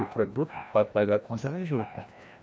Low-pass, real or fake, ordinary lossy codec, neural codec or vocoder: none; fake; none; codec, 16 kHz, 1 kbps, FreqCodec, larger model